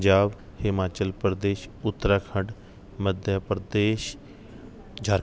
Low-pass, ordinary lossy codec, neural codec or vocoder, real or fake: none; none; none; real